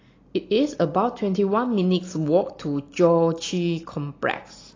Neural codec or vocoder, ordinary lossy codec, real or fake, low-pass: none; AAC, 32 kbps; real; 7.2 kHz